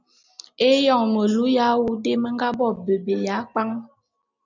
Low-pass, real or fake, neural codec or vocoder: 7.2 kHz; real; none